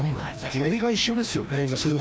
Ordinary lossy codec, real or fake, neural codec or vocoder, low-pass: none; fake; codec, 16 kHz, 1 kbps, FreqCodec, larger model; none